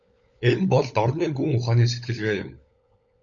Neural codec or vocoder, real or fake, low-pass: codec, 16 kHz, 16 kbps, FunCodec, trained on LibriTTS, 50 frames a second; fake; 7.2 kHz